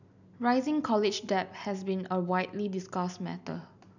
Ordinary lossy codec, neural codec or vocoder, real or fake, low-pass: none; none; real; 7.2 kHz